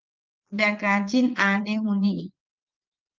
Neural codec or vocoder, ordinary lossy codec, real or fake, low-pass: vocoder, 22.05 kHz, 80 mel bands, Vocos; Opus, 32 kbps; fake; 7.2 kHz